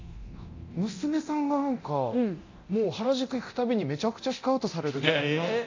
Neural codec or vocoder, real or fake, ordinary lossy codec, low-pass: codec, 24 kHz, 0.9 kbps, DualCodec; fake; AAC, 48 kbps; 7.2 kHz